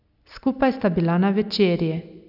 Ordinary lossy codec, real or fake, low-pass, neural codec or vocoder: none; real; 5.4 kHz; none